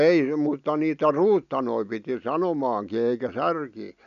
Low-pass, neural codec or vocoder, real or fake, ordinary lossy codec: 7.2 kHz; none; real; MP3, 96 kbps